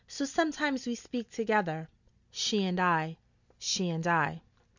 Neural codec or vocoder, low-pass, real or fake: none; 7.2 kHz; real